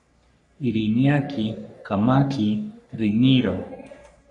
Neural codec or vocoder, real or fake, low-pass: codec, 44.1 kHz, 3.4 kbps, Pupu-Codec; fake; 10.8 kHz